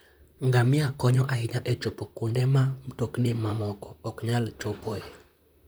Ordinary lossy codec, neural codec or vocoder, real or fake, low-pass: none; vocoder, 44.1 kHz, 128 mel bands, Pupu-Vocoder; fake; none